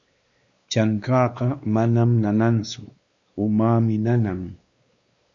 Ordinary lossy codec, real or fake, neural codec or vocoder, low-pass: Opus, 64 kbps; fake; codec, 16 kHz, 2 kbps, X-Codec, WavLM features, trained on Multilingual LibriSpeech; 7.2 kHz